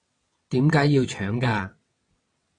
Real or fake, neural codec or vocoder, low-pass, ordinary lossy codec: fake; vocoder, 22.05 kHz, 80 mel bands, WaveNeXt; 9.9 kHz; AAC, 32 kbps